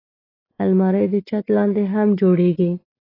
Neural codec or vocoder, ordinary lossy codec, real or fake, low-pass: none; AAC, 24 kbps; real; 5.4 kHz